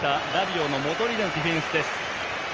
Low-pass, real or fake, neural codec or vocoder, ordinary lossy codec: 7.2 kHz; real; none; Opus, 24 kbps